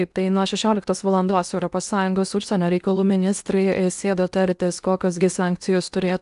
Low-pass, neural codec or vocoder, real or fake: 10.8 kHz; codec, 16 kHz in and 24 kHz out, 0.8 kbps, FocalCodec, streaming, 65536 codes; fake